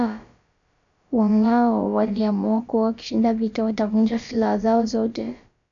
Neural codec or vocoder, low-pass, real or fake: codec, 16 kHz, about 1 kbps, DyCAST, with the encoder's durations; 7.2 kHz; fake